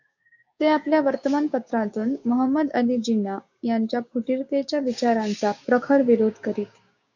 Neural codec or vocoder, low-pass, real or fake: codec, 16 kHz, 6 kbps, DAC; 7.2 kHz; fake